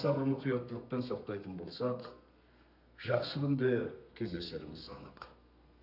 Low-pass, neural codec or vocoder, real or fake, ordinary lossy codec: 5.4 kHz; codec, 32 kHz, 1.9 kbps, SNAC; fake; none